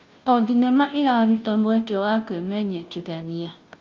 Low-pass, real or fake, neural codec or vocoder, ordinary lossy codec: 7.2 kHz; fake; codec, 16 kHz, 0.5 kbps, FunCodec, trained on Chinese and English, 25 frames a second; Opus, 24 kbps